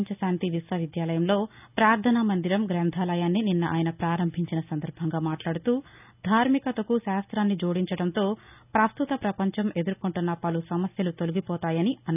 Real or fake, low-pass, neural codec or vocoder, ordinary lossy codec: real; 3.6 kHz; none; none